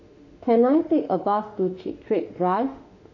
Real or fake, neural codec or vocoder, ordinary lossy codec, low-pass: fake; autoencoder, 48 kHz, 32 numbers a frame, DAC-VAE, trained on Japanese speech; none; 7.2 kHz